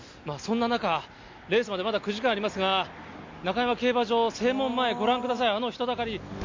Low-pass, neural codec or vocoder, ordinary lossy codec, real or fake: 7.2 kHz; none; MP3, 48 kbps; real